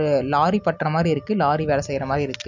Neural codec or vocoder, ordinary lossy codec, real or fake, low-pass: none; none; real; 7.2 kHz